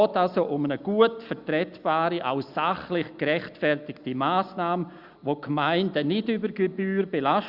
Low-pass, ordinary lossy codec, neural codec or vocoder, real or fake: 5.4 kHz; Opus, 64 kbps; none; real